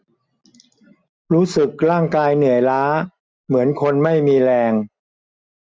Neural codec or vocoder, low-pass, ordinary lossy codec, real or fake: none; none; none; real